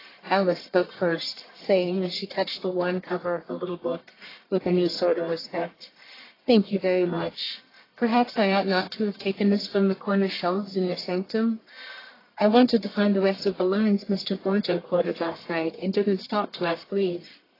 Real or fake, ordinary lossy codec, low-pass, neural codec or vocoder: fake; AAC, 24 kbps; 5.4 kHz; codec, 44.1 kHz, 1.7 kbps, Pupu-Codec